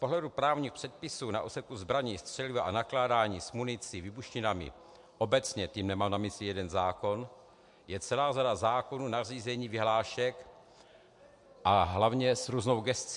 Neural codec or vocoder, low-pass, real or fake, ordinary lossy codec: none; 10.8 kHz; real; MP3, 64 kbps